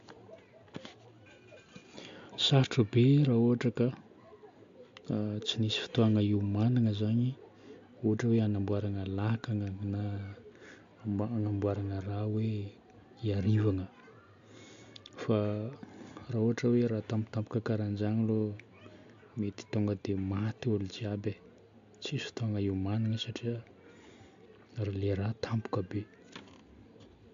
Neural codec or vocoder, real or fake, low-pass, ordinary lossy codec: none; real; 7.2 kHz; AAC, 64 kbps